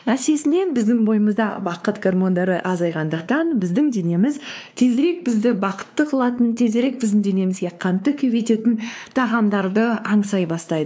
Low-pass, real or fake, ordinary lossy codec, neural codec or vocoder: none; fake; none; codec, 16 kHz, 2 kbps, X-Codec, WavLM features, trained on Multilingual LibriSpeech